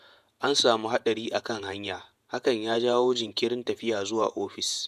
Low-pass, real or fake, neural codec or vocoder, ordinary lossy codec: 14.4 kHz; fake; vocoder, 48 kHz, 128 mel bands, Vocos; MP3, 96 kbps